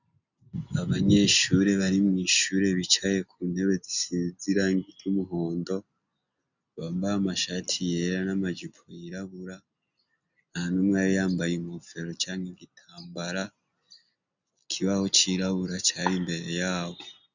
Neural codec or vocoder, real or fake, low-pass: none; real; 7.2 kHz